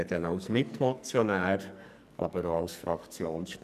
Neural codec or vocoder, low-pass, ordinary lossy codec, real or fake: codec, 44.1 kHz, 2.6 kbps, SNAC; 14.4 kHz; none; fake